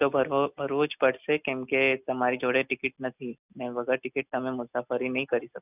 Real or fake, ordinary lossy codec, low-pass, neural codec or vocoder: real; none; 3.6 kHz; none